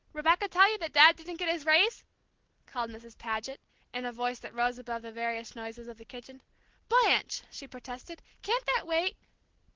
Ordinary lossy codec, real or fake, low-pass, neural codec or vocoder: Opus, 16 kbps; real; 7.2 kHz; none